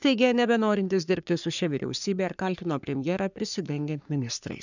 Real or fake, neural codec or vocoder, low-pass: fake; codec, 44.1 kHz, 3.4 kbps, Pupu-Codec; 7.2 kHz